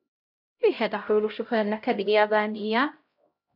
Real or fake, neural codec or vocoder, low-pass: fake; codec, 16 kHz, 0.5 kbps, X-Codec, HuBERT features, trained on LibriSpeech; 5.4 kHz